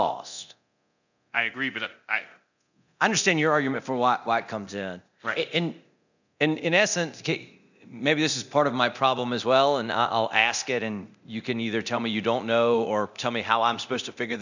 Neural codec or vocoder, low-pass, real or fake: codec, 24 kHz, 0.9 kbps, DualCodec; 7.2 kHz; fake